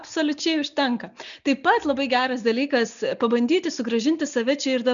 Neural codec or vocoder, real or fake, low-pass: none; real; 7.2 kHz